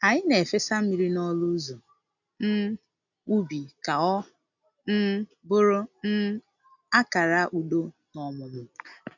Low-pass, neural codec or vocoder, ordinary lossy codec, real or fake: 7.2 kHz; none; none; real